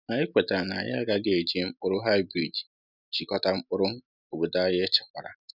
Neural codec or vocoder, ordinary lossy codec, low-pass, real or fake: none; none; 5.4 kHz; real